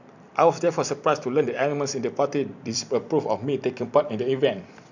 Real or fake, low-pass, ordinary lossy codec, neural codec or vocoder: fake; 7.2 kHz; none; vocoder, 44.1 kHz, 128 mel bands every 256 samples, BigVGAN v2